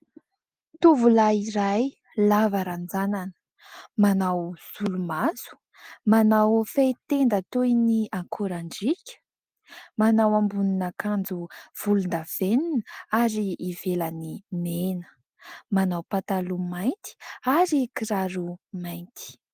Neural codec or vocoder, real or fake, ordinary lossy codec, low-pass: none; real; Opus, 24 kbps; 14.4 kHz